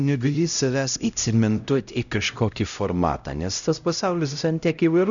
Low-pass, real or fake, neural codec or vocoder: 7.2 kHz; fake; codec, 16 kHz, 0.5 kbps, X-Codec, HuBERT features, trained on LibriSpeech